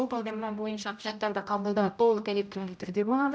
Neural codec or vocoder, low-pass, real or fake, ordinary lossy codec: codec, 16 kHz, 0.5 kbps, X-Codec, HuBERT features, trained on general audio; none; fake; none